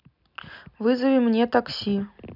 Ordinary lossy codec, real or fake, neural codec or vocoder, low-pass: none; real; none; 5.4 kHz